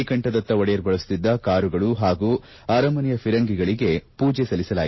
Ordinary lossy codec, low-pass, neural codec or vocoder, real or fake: MP3, 24 kbps; 7.2 kHz; none; real